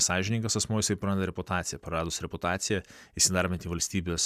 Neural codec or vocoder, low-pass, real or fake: none; 14.4 kHz; real